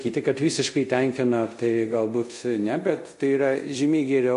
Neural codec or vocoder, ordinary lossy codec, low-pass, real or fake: codec, 24 kHz, 0.5 kbps, DualCodec; MP3, 48 kbps; 10.8 kHz; fake